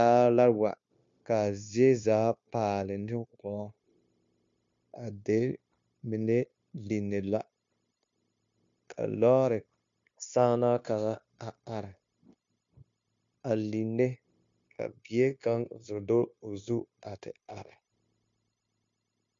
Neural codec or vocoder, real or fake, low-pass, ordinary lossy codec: codec, 16 kHz, 0.9 kbps, LongCat-Audio-Codec; fake; 7.2 kHz; MP3, 48 kbps